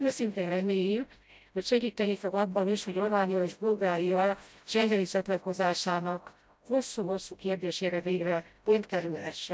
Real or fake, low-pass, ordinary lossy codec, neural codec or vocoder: fake; none; none; codec, 16 kHz, 0.5 kbps, FreqCodec, smaller model